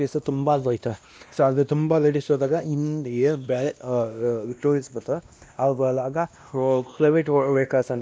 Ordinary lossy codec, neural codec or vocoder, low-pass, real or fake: none; codec, 16 kHz, 1 kbps, X-Codec, WavLM features, trained on Multilingual LibriSpeech; none; fake